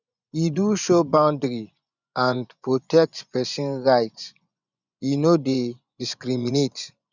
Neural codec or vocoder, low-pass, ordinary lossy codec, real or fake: vocoder, 24 kHz, 100 mel bands, Vocos; 7.2 kHz; none; fake